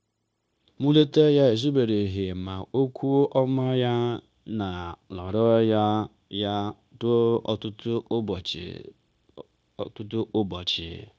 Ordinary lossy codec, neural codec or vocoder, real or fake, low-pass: none; codec, 16 kHz, 0.9 kbps, LongCat-Audio-Codec; fake; none